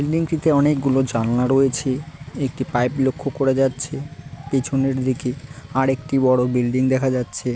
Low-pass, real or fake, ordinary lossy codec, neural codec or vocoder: none; real; none; none